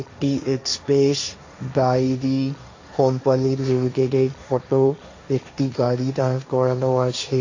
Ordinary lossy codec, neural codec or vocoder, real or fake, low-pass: AAC, 48 kbps; codec, 16 kHz, 1.1 kbps, Voila-Tokenizer; fake; 7.2 kHz